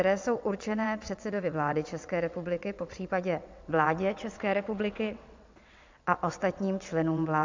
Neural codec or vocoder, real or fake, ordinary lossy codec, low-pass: vocoder, 22.05 kHz, 80 mel bands, WaveNeXt; fake; MP3, 64 kbps; 7.2 kHz